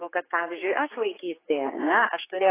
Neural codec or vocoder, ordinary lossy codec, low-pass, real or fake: codec, 16 kHz, 4 kbps, X-Codec, HuBERT features, trained on general audio; AAC, 16 kbps; 3.6 kHz; fake